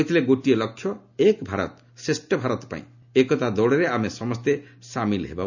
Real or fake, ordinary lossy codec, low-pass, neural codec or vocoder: real; none; 7.2 kHz; none